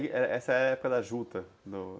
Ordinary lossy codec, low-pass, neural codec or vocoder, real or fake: none; none; none; real